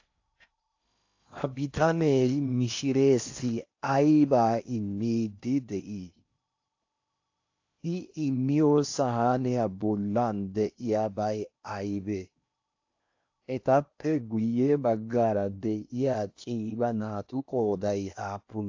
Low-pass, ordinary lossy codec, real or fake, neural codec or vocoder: 7.2 kHz; AAC, 48 kbps; fake; codec, 16 kHz in and 24 kHz out, 0.8 kbps, FocalCodec, streaming, 65536 codes